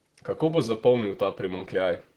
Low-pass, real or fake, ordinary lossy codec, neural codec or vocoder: 19.8 kHz; fake; Opus, 16 kbps; vocoder, 44.1 kHz, 128 mel bands, Pupu-Vocoder